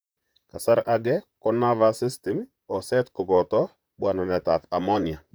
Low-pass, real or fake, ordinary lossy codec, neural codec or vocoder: none; fake; none; vocoder, 44.1 kHz, 128 mel bands, Pupu-Vocoder